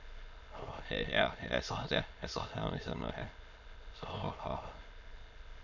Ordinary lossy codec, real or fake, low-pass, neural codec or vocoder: none; fake; 7.2 kHz; autoencoder, 22.05 kHz, a latent of 192 numbers a frame, VITS, trained on many speakers